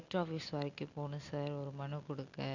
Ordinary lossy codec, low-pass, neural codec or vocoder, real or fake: Opus, 64 kbps; 7.2 kHz; none; real